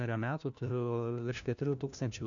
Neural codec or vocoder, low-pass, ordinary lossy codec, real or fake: codec, 16 kHz, 1 kbps, FunCodec, trained on LibriTTS, 50 frames a second; 7.2 kHz; MP3, 96 kbps; fake